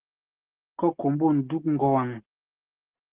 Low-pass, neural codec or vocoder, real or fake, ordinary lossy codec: 3.6 kHz; none; real; Opus, 16 kbps